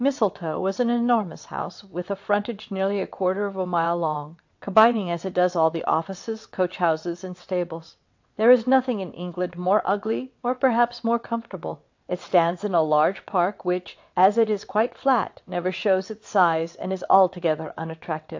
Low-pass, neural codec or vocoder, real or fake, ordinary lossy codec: 7.2 kHz; vocoder, 22.05 kHz, 80 mel bands, WaveNeXt; fake; AAC, 48 kbps